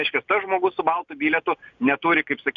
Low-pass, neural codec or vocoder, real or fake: 7.2 kHz; none; real